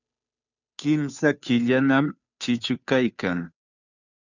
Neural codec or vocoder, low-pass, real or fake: codec, 16 kHz, 2 kbps, FunCodec, trained on Chinese and English, 25 frames a second; 7.2 kHz; fake